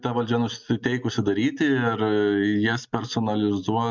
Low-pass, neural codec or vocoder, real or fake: 7.2 kHz; none; real